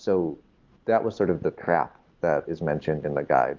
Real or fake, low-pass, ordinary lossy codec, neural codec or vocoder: real; 7.2 kHz; Opus, 32 kbps; none